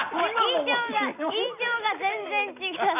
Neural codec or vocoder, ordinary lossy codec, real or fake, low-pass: none; none; real; 3.6 kHz